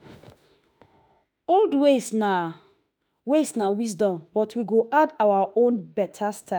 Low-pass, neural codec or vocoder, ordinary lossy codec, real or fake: none; autoencoder, 48 kHz, 32 numbers a frame, DAC-VAE, trained on Japanese speech; none; fake